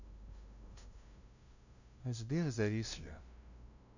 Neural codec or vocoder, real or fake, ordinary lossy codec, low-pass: codec, 16 kHz, 0.5 kbps, FunCodec, trained on LibriTTS, 25 frames a second; fake; none; 7.2 kHz